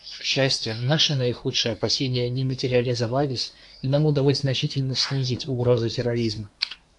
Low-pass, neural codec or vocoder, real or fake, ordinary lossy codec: 10.8 kHz; codec, 24 kHz, 1 kbps, SNAC; fake; AAC, 64 kbps